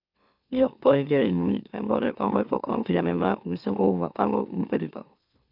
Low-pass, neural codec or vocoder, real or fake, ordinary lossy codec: 5.4 kHz; autoencoder, 44.1 kHz, a latent of 192 numbers a frame, MeloTTS; fake; none